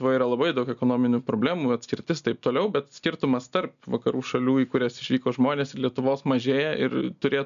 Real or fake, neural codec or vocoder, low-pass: real; none; 7.2 kHz